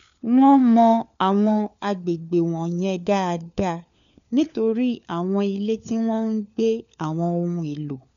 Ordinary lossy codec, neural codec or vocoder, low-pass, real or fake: none; codec, 16 kHz, 4 kbps, FunCodec, trained on LibriTTS, 50 frames a second; 7.2 kHz; fake